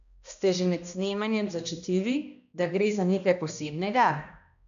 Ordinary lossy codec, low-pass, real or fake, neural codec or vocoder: none; 7.2 kHz; fake; codec, 16 kHz, 1 kbps, X-Codec, HuBERT features, trained on balanced general audio